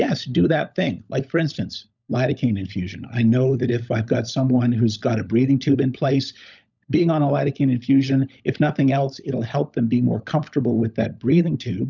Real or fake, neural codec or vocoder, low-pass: fake; codec, 16 kHz, 16 kbps, FunCodec, trained on LibriTTS, 50 frames a second; 7.2 kHz